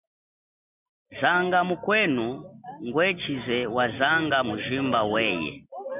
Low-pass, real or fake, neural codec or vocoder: 3.6 kHz; real; none